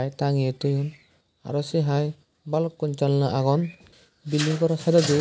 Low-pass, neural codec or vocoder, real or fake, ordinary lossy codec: none; none; real; none